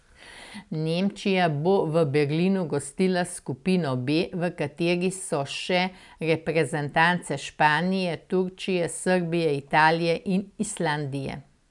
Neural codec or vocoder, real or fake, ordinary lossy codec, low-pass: none; real; none; 10.8 kHz